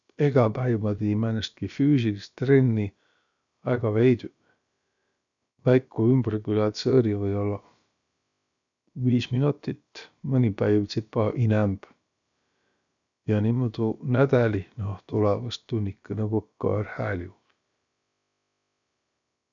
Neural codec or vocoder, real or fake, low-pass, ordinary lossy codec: codec, 16 kHz, about 1 kbps, DyCAST, with the encoder's durations; fake; 7.2 kHz; none